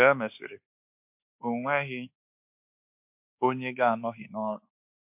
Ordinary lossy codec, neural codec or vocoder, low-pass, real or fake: MP3, 32 kbps; codec, 24 kHz, 1.2 kbps, DualCodec; 3.6 kHz; fake